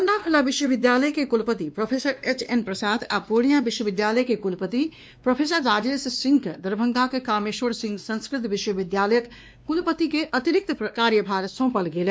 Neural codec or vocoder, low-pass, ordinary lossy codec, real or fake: codec, 16 kHz, 2 kbps, X-Codec, WavLM features, trained on Multilingual LibriSpeech; none; none; fake